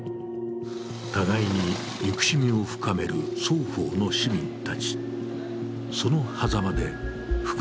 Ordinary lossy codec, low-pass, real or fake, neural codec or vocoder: none; none; real; none